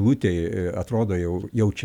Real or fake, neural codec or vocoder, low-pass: real; none; 19.8 kHz